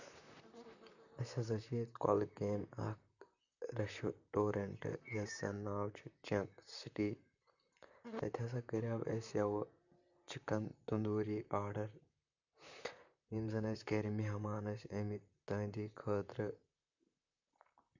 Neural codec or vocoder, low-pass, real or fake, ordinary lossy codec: vocoder, 44.1 kHz, 128 mel bands, Pupu-Vocoder; 7.2 kHz; fake; none